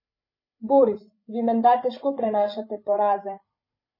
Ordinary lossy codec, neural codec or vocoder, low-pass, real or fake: MP3, 24 kbps; codec, 16 kHz, 16 kbps, FreqCodec, larger model; 5.4 kHz; fake